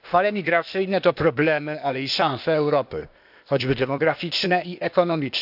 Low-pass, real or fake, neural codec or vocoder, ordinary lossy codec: 5.4 kHz; fake; codec, 16 kHz, 0.8 kbps, ZipCodec; none